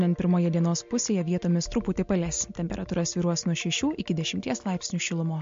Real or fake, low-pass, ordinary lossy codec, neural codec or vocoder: real; 7.2 kHz; MP3, 48 kbps; none